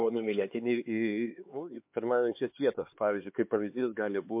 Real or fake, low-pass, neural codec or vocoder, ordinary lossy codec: fake; 3.6 kHz; codec, 16 kHz, 4 kbps, X-Codec, HuBERT features, trained on LibriSpeech; MP3, 32 kbps